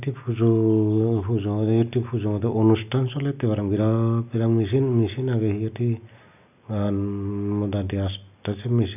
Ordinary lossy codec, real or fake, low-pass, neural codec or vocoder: none; real; 3.6 kHz; none